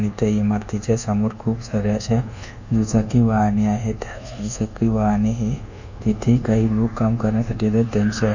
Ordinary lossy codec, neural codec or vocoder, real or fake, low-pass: none; codec, 24 kHz, 1.2 kbps, DualCodec; fake; 7.2 kHz